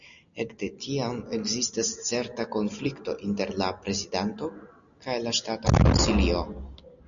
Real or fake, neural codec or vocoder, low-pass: real; none; 7.2 kHz